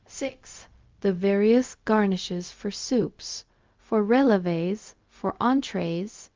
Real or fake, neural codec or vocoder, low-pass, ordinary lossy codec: fake; codec, 16 kHz, 0.4 kbps, LongCat-Audio-Codec; 7.2 kHz; Opus, 32 kbps